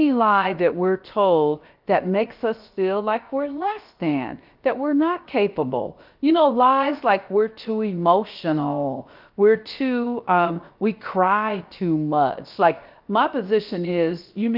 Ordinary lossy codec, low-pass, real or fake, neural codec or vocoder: Opus, 24 kbps; 5.4 kHz; fake; codec, 16 kHz, about 1 kbps, DyCAST, with the encoder's durations